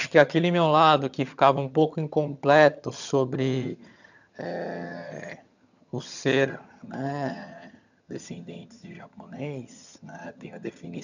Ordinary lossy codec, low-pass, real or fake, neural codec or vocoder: none; 7.2 kHz; fake; vocoder, 22.05 kHz, 80 mel bands, HiFi-GAN